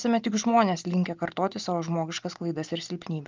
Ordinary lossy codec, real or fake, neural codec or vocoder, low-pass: Opus, 32 kbps; real; none; 7.2 kHz